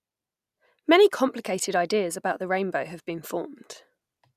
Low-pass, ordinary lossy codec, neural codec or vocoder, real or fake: 14.4 kHz; none; none; real